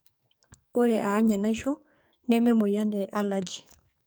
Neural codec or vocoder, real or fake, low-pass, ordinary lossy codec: codec, 44.1 kHz, 2.6 kbps, SNAC; fake; none; none